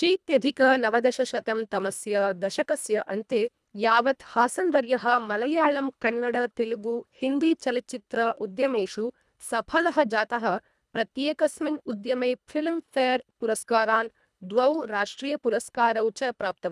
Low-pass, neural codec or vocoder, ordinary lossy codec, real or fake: none; codec, 24 kHz, 1.5 kbps, HILCodec; none; fake